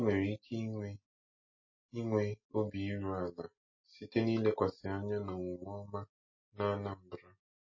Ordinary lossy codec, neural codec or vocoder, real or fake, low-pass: MP3, 32 kbps; none; real; 7.2 kHz